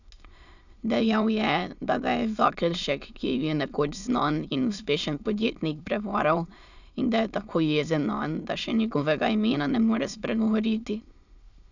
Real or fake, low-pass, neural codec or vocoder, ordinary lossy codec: fake; 7.2 kHz; autoencoder, 22.05 kHz, a latent of 192 numbers a frame, VITS, trained on many speakers; none